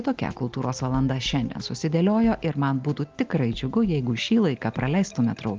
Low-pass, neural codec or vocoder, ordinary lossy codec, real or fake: 7.2 kHz; none; Opus, 32 kbps; real